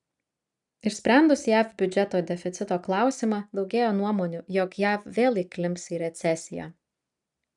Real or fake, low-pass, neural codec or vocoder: real; 10.8 kHz; none